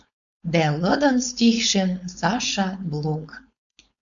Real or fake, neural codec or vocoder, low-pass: fake; codec, 16 kHz, 4.8 kbps, FACodec; 7.2 kHz